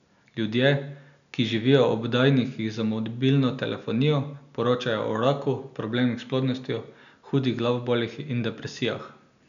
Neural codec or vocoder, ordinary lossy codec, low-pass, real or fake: none; none; 7.2 kHz; real